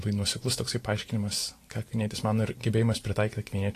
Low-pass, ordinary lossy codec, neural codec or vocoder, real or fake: 14.4 kHz; AAC, 48 kbps; vocoder, 44.1 kHz, 128 mel bands every 512 samples, BigVGAN v2; fake